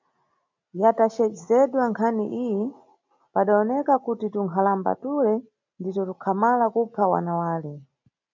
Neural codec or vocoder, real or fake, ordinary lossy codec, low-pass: none; real; MP3, 64 kbps; 7.2 kHz